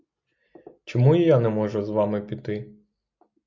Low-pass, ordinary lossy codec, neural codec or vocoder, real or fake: 7.2 kHz; MP3, 64 kbps; none; real